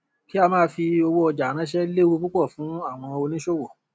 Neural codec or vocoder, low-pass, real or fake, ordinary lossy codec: none; none; real; none